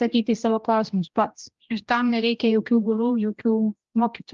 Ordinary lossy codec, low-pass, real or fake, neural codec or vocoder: Opus, 32 kbps; 7.2 kHz; fake; codec, 16 kHz, 1 kbps, X-Codec, HuBERT features, trained on general audio